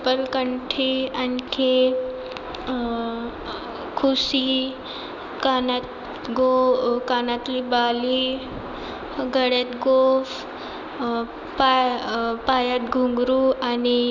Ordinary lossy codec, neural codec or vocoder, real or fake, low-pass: none; none; real; 7.2 kHz